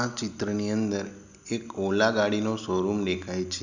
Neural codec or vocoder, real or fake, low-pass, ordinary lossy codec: none; real; 7.2 kHz; none